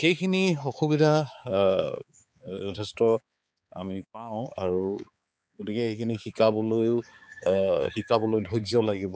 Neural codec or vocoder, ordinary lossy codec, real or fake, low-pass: codec, 16 kHz, 4 kbps, X-Codec, HuBERT features, trained on balanced general audio; none; fake; none